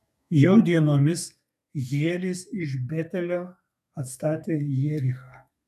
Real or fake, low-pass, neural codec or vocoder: fake; 14.4 kHz; codec, 32 kHz, 1.9 kbps, SNAC